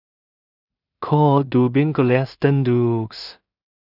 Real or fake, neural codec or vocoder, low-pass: fake; codec, 16 kHz in and 24 kHz out, 0.4 kbps, LongCat-Audio-Codec, two codebook decoder; 5.4 kHz